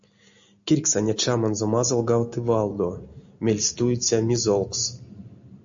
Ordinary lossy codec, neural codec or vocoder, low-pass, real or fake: AAC, 64 kbps; none; 7.2 kHz; real